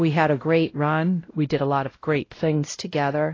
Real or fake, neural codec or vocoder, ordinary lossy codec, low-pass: fake; codec, 16 kHz, 0.5 kbps, X-Codec, WavLM features, trained on Multilingual LibriSpeech; AAC, 32 kbps; 7.2 kHz